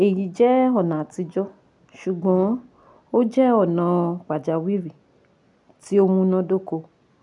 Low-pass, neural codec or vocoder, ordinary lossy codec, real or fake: 10.8 kHz; none; none; real